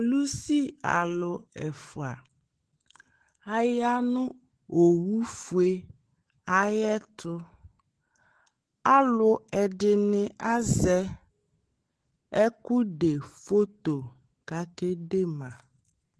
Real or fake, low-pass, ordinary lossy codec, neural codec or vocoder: fake; 10.8 kHz; Opus, 16 kbps; autoencoder, 48 kHz, 128 numbers a frame, DAC-VAE, trained on Japanese speech